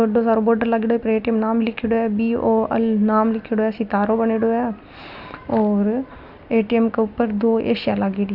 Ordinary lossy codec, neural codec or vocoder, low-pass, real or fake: none; none; 5.4 kHz; real